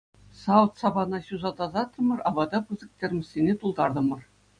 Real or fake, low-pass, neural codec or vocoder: real; 9.9 kHz; none